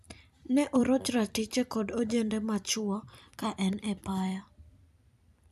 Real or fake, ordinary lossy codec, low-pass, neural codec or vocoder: fake; none; 14.4 kHz; vocoder, 44.1 kHz, 128 mel bands every 256 samples, BigVGAN v2